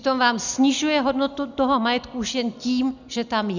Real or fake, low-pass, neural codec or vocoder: real; 7.2 kHz; none